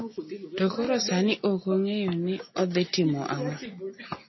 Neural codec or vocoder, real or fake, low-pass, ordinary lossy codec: none; real; 7.2 kHz; MP3, 24 kbps